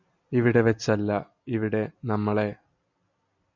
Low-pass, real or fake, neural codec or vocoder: 7.2 kHz; real; none